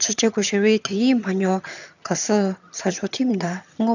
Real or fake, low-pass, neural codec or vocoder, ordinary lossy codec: fake; 7.2 kHz; vocoder, 22.05 kHz, 80 mel bands, HiFi-GAN; none